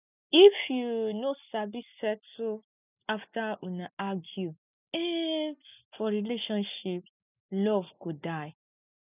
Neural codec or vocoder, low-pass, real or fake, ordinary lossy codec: none; 3.6 kHz; real; none